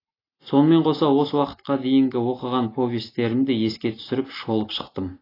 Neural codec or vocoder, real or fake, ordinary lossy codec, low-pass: none; real; AAC, 24 kbps; 5.4 kHz